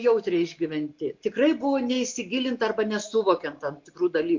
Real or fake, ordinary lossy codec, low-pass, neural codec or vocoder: real; MP3, 48 kbps; 7.2 kHz; none